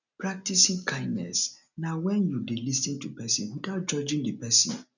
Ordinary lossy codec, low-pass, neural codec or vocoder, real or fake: none; 7.2 kHz; none; real